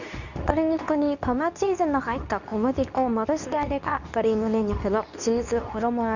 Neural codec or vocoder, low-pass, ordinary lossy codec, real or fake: codec, 24 kHz, 0.9 kbps, WavTokenizer, medium speech release version 2; 7.2 kHz; none; fake